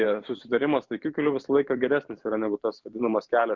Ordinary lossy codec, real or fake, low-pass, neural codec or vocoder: Opus, 64 kbps; fake; 7.2 kHz; vocoder, 44.1 kHz, 128 mel bands every 512 samples, BigVGAN v2